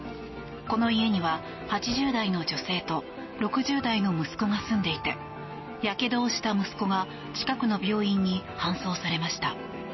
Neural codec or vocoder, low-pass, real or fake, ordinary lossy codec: none; 7.2 kHz; real; MP3, 24 kbps